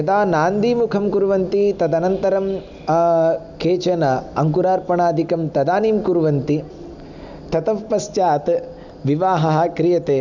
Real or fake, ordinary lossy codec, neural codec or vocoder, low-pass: real; none; none; 7.2 kHz